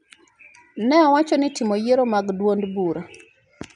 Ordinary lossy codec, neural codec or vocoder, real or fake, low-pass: none; none; real; 10.8 kHz